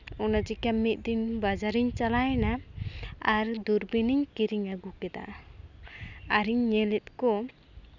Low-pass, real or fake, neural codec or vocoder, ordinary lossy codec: 7.2 kHz; real; none; none